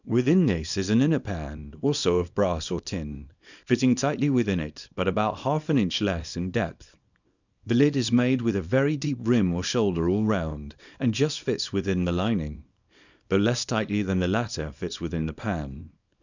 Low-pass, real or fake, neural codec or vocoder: 7.2 kHz; fake; codec, 24 kHz, 0.9 kbps, WavTokenizer, small release